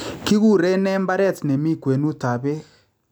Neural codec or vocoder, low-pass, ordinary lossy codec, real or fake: none; none; none; real